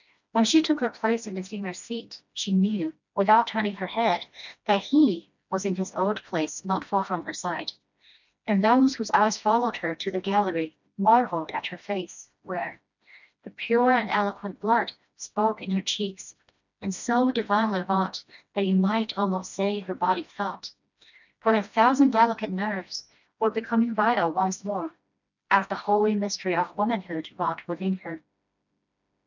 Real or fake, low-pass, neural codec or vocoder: fake; 7.2 kHz; codec, 16 kHz, 1 kbps, FreqCodec, smaller model